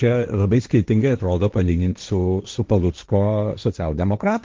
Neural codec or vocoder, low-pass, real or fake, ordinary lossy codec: codec, 16 kHz, 1.1 kbps, Voila-Tokenizer; 7.2 kHz; fake; Opus, 32 kbps